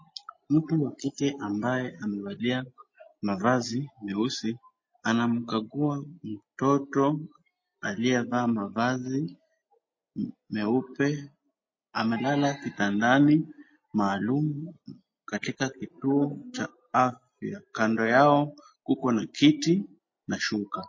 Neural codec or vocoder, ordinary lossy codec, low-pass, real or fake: none; MP3, 32 kbps; 7.2 kHz; real